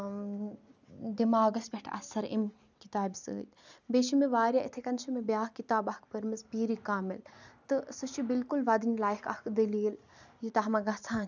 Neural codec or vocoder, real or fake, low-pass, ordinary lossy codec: none; real; 7.2 kHz; none